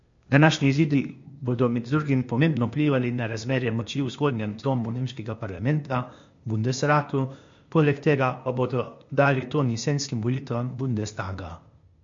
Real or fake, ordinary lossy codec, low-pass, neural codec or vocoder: fake; MP3, 48 kbps; 7.2 kHz; codec, 16 kHz, 0.8 kbps, ZipCodec